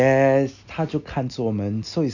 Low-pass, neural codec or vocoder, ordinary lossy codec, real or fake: 7.2 kHz; none; none; real